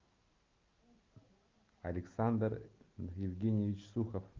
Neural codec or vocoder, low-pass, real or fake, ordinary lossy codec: none; 7.2 kHz; real; Opus, 24 kbps